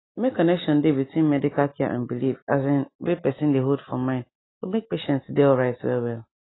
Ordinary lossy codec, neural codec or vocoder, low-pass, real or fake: AAC, 16 kbps; none; 7.2 kHz; real